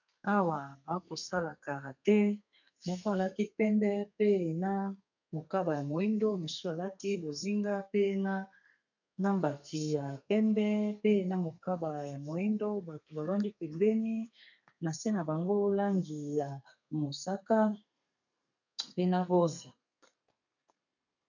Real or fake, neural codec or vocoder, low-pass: fake; codec, 32 kHz, 1.9 kbps, SNAC; 7.2 kHz